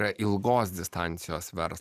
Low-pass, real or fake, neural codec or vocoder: 14.4 kHz; fake; vocoder, 44.1 kHz, 128 mel bands every 512 samples, BigVGAN v2